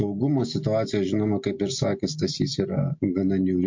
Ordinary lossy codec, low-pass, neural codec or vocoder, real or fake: MP3, 48 kbps; 7.2 kHz; none; real